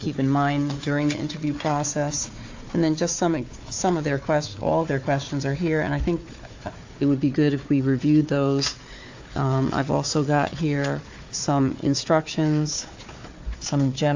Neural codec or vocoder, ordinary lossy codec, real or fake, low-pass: codec, 16 kHz, 4 kbps, FunCodec, trained on Chinese and English, 50 frames a second; AAC, 48 kbps; fake; 7.2 kHz